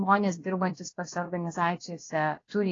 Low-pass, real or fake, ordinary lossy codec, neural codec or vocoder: 7.2 kHz; fake; AAC, 32 kbps; codec, 16 kHz, about 1 kbps, DyCAST, with the encoder's durations